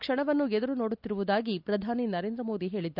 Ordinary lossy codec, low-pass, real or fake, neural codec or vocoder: none; 5.4 kHz; real; none